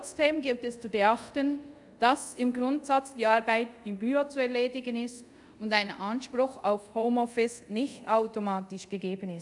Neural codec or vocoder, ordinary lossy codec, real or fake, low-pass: codec, 24 kHz, 0.5 kbps, DualCodec; none; fake; 10.8 kHz